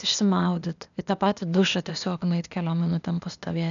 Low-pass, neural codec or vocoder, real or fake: 7.2 kHz; codec, 16 kHz, 0.8 kbps, ZipCodec; fake